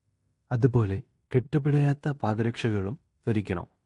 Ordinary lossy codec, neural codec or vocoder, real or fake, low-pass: AAC, 48 kbps; codec, 16 kHz in and 24 kHz out, 0.9 kbps, LongCat-Audio-Codec, fine tuned four codebook decoder; fake; 10.8 kHz